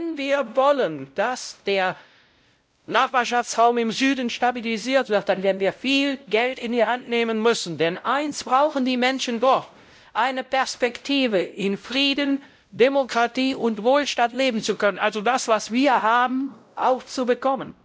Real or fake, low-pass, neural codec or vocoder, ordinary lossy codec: fake; none; codec, 16 kHz, 0.5 kbps, X-Codec, WavLM features, trained on Multilingual LibriSpeech; none